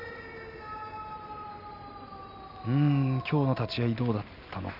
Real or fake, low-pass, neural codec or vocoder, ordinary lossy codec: real; 5.4 kHz; none; none